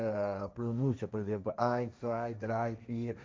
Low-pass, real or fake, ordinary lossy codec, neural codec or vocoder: none; fake; none; codec, 16 kHz, 1.1 kbps, Voila-Tokenizer